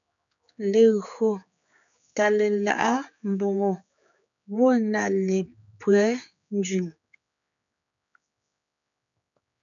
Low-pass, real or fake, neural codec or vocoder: 7.2 kHz; fake; codec, 16 kHz, 4 kbps, X-Codec, HuBERT features, trained on general audio